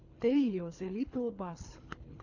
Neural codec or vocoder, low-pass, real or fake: codec, 24 kHz, 3 kbps, HILCodec; 7.2 kHz; fake